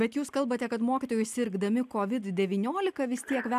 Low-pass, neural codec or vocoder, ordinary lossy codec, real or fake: 14.4 kHz; none; AAC, 96 kbps; real